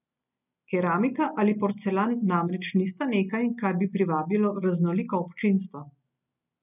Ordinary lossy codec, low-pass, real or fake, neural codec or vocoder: none; 3.6 kHz; real; none